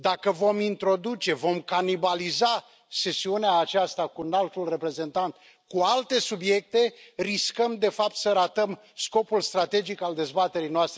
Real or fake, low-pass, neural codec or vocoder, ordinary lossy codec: real; none; none; none